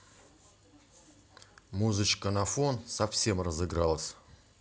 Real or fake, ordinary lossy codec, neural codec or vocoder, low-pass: real; none; none; none